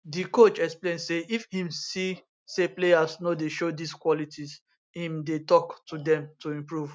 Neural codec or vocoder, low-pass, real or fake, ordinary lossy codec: none; none; real; none